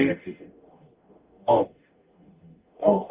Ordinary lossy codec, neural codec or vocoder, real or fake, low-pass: Opus, 32 kbps; codec, 44.1 kHz, 0.9 kbps, DAC; fake; 3.6 kHz